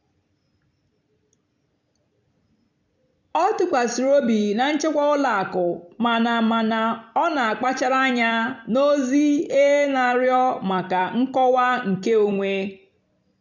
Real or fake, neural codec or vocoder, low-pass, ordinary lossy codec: fake; vocoder, 44.1 kHz, 128 mel bands every 256 samples, BigVGAN v2; 7.2 kHz; none